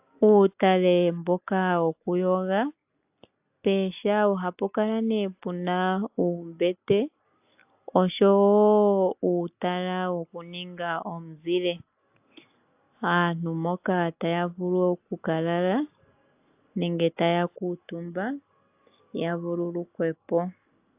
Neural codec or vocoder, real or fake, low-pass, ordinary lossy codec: none; real; 3.6 kHz; AAC, 32 kbps